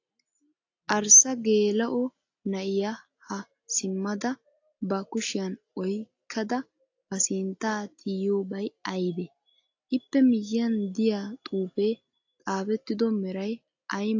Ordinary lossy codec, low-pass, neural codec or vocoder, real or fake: AAC, 48 kbps; 7.2 kHz; none; real